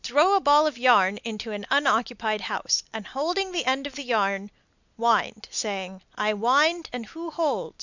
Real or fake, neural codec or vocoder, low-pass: real; none; 7.2 kHz